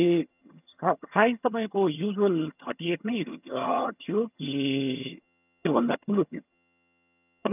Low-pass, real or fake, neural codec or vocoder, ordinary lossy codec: 3.6 kHz; fake; vocoder, 22.05 kHz, 80 mel bands, HiFi-GAN; none